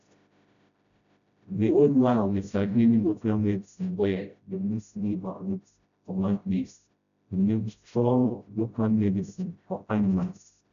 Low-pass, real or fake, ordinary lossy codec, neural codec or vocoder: 7.2 kHz; fake; MP3, 96 kbps; codec, 16 kHz, 0.5 kbps, FreqCodec, smaller model